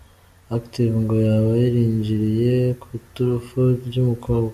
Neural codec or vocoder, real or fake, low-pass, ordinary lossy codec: none; real; 14.4 kHz; AAC, 96 kbps